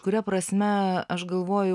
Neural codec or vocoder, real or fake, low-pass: none; real; 10.8 kHz